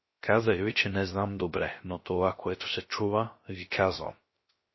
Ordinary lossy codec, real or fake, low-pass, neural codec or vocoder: MP3, 24 kbps; fake; 7.2 kHz; codec, 16 kHz, 0.3 kbps, FocalCodec